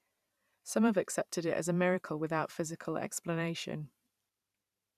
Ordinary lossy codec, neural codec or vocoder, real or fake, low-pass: none; vocoder, 44.1 kHz, 128 mel bands every 512 samples, BigVGAN v2; fake; 14.4 kHz